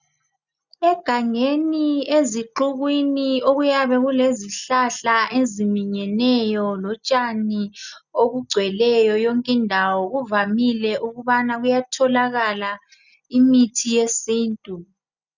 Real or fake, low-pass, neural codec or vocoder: real; 7.2 kHz; none